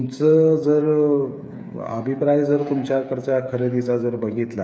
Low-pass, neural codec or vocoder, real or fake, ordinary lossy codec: none; codec, 16 kHz, 8 kbps, FreqCodec, smaller model; fake; none